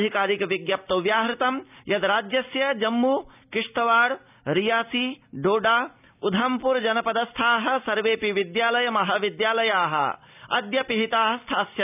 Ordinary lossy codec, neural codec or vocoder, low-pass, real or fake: none; none; 3.6 kHz; real